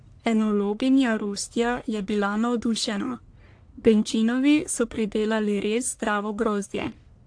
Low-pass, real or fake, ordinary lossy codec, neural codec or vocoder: 9.9 kHz; fake; AAC, 64 kbps; codec, 44.1 kHz, 1.7 kbps, Pupu-Codec